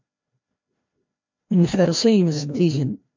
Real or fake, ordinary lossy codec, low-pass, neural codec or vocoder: fake; MP3, 48 kbps; 7.2 kHz; codec, 16 kHz, 1 kbps, FreqCodec, larger model